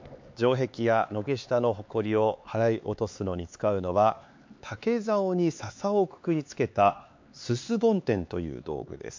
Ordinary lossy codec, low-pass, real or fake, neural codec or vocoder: MP3, 48 kbps; 7.2 kHz; fake; codec, 16 kHz, 4 kbps, X-Codec, HuBERT features, trained on LibriSpeech